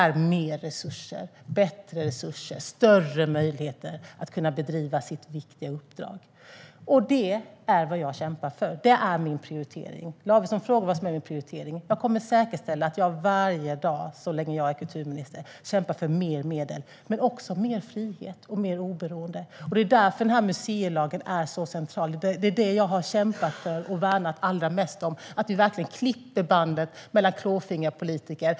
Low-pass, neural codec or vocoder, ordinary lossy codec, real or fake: none; none; none; real